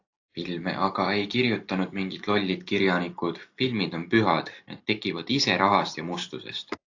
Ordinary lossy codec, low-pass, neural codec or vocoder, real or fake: AAC, 48 kbps; 7.2 kHz; none; real